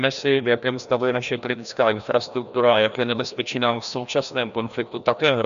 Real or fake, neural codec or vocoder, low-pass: fake; codec, 16 kHz, 1 kbps, FreqCodec, larger model; 7.2 kHz